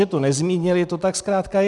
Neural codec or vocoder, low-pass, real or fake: none; 10.8 kHz; real